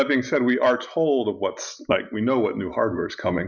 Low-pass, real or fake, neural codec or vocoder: 7.2 kHz; real; none